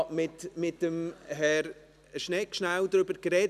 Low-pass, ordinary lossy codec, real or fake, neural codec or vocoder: 14.4 kHz; none; real; none